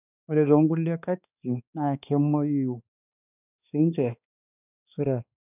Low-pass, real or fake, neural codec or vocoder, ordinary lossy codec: 3.6 kHz; fake; codec, 16 kHz, 2 kbps, X-Codec, HuBERT features, trained on balanced general audio; none